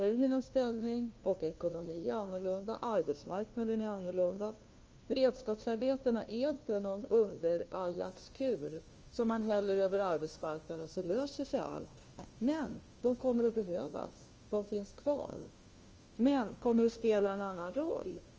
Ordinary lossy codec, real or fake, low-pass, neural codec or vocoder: Opus, 24 kbps; fake; 7.2 kHz; codec, 16 kHz, 1 kbps, FunCodec, trained on LibriTTS, 50 frames a second